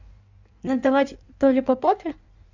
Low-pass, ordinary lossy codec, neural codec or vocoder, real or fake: 7.2 kHz; none; codec, 16 kHz in and 24 kHz out, 1.1 kbps, FireRedTTS-2 codec; fake